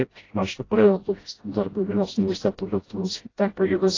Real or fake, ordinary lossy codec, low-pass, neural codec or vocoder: fake; AAC, 32 kbps; 7.2 kHz; codec, 16 kHz, 0.5 kbps, FreqCodec, smaller model